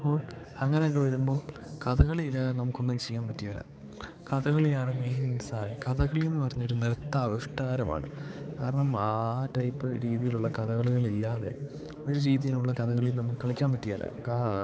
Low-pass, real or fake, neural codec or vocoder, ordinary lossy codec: none; fake; codec, 16 kHz, 4 kbps, X-Codec, HuBERT features, trained on balanced general audio; none